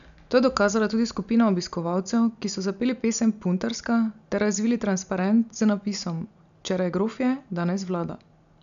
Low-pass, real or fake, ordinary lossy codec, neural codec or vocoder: 7.2 kHz; real; none; none